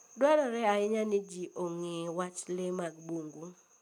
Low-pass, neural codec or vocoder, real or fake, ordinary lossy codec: 19.8 kHz; none; real; none